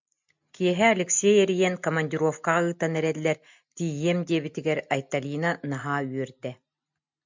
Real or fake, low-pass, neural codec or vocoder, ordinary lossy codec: real; 7.2 kHz; none; MP3, 64 kbps